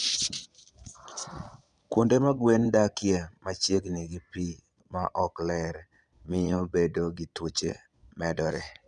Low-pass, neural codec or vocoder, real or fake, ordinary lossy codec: 9.9 kHz; vocoder, 22.05 kHz, 80 mel bands, Vocos; fake; none